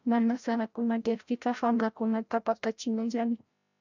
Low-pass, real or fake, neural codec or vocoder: 7.2 kHz; fake; codec, 16 kHz, 0.5 kbps, FreqCodec, larger model